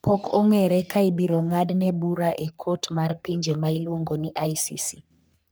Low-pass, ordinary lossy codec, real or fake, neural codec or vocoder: none; none; fake; codec, 44.1 kHz, 3.4 kbps, Pupu-Codec